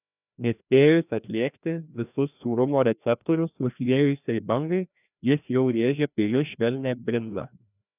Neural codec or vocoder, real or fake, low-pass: codec, 16 kHz, 1 kbps, FreqCodec, larger model; fake; 3.6 kHz